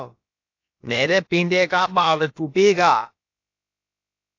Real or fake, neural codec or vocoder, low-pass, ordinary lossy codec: fake; codec, 16 kHz, about 1 kbps, DyCAST, with the encoder's durations; 7.2 kHz; AAC, 48 kbps